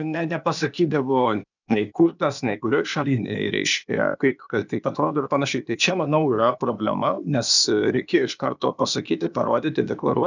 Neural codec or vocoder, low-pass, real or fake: codec, 16 kHz, 0.8 kbps, ZipCodec; 7.2 kHz; fake